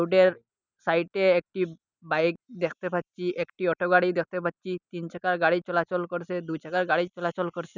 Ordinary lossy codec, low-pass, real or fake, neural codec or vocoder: none; 7.2 kHz; real; none